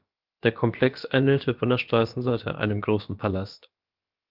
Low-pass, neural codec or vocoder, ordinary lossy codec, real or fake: 5.4 kHz; codec, 16 kHz, about 1 kbps, DyCAST, with the encoder's durations; Opus, 32 kbps; fake